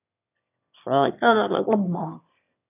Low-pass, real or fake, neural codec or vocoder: 3.6 kHz; fake; autoencoder, 22.05 kHz, a latent of 192 numbers a frame, VITS, trained on one speaker